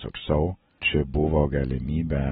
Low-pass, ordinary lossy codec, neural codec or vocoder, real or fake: 19.8 kHz; AAC, 16 kbps; none; real